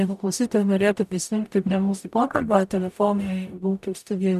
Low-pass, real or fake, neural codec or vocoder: 14.4 kHz; fake; codec, 44.1 kHz, 0.9 kbps, DAC